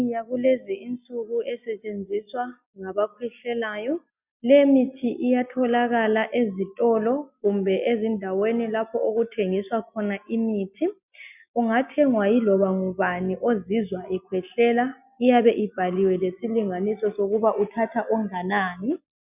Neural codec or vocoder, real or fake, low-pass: none; real; 3.6 kHz